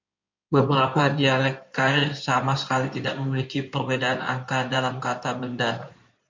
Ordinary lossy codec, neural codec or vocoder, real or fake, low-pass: MP3, 64 kbps; codec, 16 kHz in and 24 kHz out, 2.2 kbps, FireRedTTS-2 codec; fake; 7.2 kHz